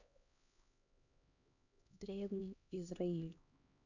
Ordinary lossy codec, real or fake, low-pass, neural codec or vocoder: none; fake; 7.2 kHz; codec, 16 kHz, 1 kbps, X-Codec, HuBERT features, trained on LibriSpeech